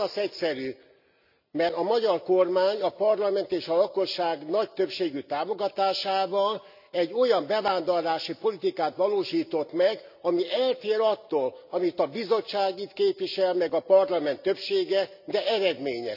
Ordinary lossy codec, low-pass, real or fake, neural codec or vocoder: none; 5.4 kHz; real; none